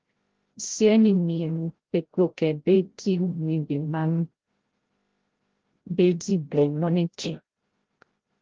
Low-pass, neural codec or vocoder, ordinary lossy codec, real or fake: 7.2 kHz; codec, 16 kHz, 0.5 kbps, FreqCodec, larger model; Opus, 16 kbps; fake